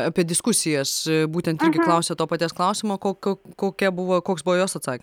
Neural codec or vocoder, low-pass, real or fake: none; 19.8 kHz; real